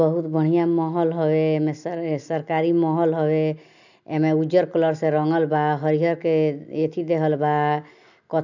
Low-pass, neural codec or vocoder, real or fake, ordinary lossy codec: 7.2 kHz; none; real; none